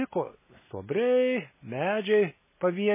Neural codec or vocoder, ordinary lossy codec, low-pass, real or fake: none; MP3, 16 kbps; 3.6 kHz; real